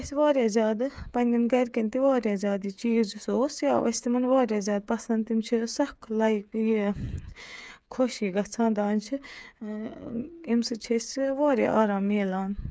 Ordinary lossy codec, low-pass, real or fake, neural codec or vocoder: none; none; fake; codec, 16 kHz, 8 kbps, FreqCodec, smaller model